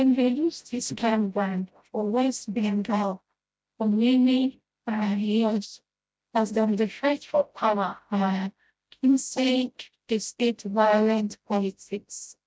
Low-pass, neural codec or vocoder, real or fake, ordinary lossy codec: none; codec, 16 kHz, 0.5 kbps, FreqCodec, smaller model; fake; none